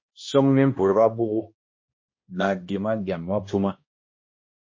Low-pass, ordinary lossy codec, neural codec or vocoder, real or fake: 7.2 kHz; MP3, 32 kbps; codec, 16 kHz, 1 kbps, X-Codec, HuBERT features, trained on balanced general audio; fake